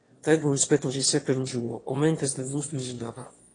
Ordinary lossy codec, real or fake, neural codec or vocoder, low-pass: AAC, 32 kbps; fake; autoencoder, 22.05 kHz, a latent of 192 numbers a frame, VITS, trained on one speaker; 9.9 kHz